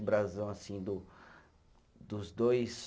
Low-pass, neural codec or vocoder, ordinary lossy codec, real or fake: none; none; none; real